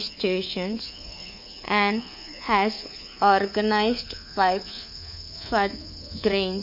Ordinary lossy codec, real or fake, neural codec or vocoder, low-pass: MP3, 32 kbps; fake; codec, 24 kHz, 3.1 kbps, DualCodec; 5.4 kHz